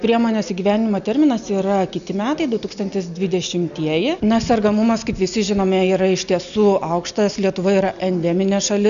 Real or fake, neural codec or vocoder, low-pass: real; none; 7.2 kHz